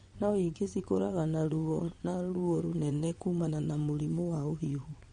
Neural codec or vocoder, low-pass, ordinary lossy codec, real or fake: vocoder, 22.05 kHz, 80 mel bands, WaveNeXt; 9.9 kHz; MP3, 48 kbps; fake